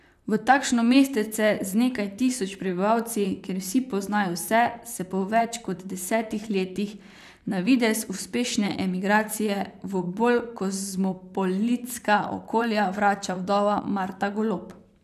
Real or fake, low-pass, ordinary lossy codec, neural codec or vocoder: fake; 14.4 kHz; none; vocoder, 44.1 kHz, 128 mel bands, Pupu-Vocoder